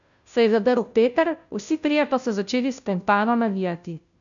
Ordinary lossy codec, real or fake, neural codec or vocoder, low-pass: none; fake; codec, 16 kHz, 0.5 kbps, FunCodec, trained on Chinese and English, 25 frames a second; 7.2 kHz